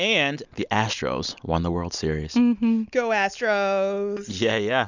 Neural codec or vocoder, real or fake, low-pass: none; real; 7.2 kHz